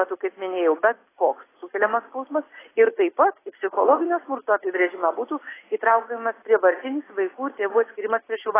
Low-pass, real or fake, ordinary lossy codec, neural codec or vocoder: 3.6 kHz; real; AAC, 16 kbps; none